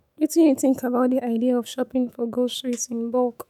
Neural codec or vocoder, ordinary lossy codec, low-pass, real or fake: autoencoder, 48 kHz, 128 numbers a frame, DAC-VAE, trained on Japanese speech; none; 19.8 kHz; fake